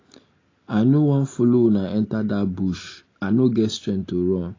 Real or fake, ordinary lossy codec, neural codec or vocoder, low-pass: real; AAC, 32 kbps; none; 7.2 kHz